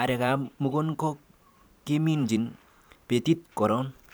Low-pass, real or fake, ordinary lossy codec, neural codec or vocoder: none; fake; none; vocoder, 44.1 kHz, 128 mel bands every 512 samples, BigVGAN v2